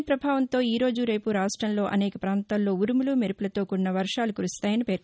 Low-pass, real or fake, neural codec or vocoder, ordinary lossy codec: none; real; none; none